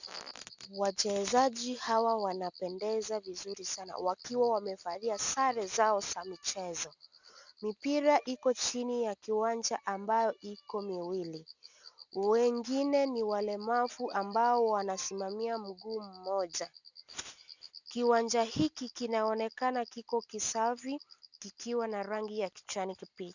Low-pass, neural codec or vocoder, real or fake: 7.2 kHz; none; real